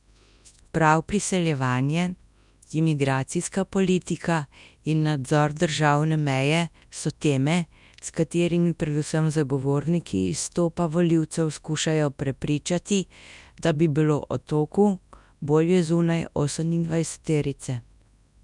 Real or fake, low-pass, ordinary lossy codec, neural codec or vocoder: fake; 10.8 kHz; none; codec, 24 kHz, 0.9 kbps, WavTokenizer, large speech release